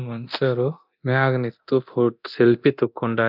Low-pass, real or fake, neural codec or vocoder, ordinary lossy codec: 5.4 kHz; fake; codec, 24 kHz, 0.9 kbps, DualCodec; none